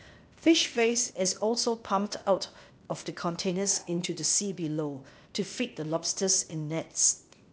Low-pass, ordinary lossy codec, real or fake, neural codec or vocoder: none; none; fake; codec, 16 kHz, 0.8 kbps, ZipCodec